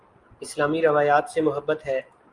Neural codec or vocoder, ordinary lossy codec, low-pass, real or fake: none; Opus, 32 kbps; 10.8 kHz; real